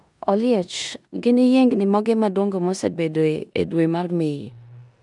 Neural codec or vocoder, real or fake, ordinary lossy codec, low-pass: codec, 16 kHz in and 24 kHz out, 0.9 kbps, LongCat-Audio-Codec, four codebook decoder; fake; none; 10.8 kHz